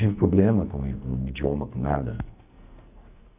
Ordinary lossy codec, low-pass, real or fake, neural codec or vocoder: none; 3.6 kHz; fake; codec, 44.1 kHz, 2.6 kbps, SNAC